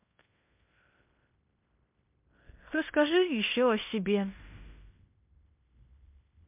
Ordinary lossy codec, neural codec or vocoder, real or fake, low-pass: MP3, 32 kbps; codec, 16 kHz in and 24 kHz out, 0.9 kbps, LongCat-Audio-Codec, fine tuned four codebook decoder; fake; 3.6 kHz